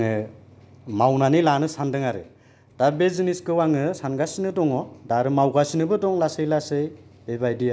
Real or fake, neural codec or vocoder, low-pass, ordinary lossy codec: real; none; none; none